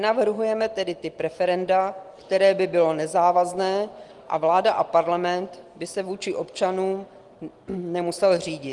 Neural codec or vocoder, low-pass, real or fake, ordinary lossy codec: none; 10.8 kHz; real; Opus, 24 kbps